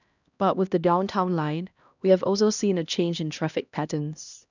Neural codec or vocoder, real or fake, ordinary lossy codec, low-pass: codec, 16 kHz, 1 kbps, X-Codec, HuBERT features, trained on LibriSpeech; fake; none; 7.2 kHz